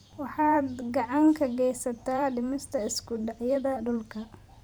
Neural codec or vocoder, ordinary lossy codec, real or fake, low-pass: vocoder, 44.1 kHz, 128 mel bands every 512 samples, BigVGAN v2; none; fake; none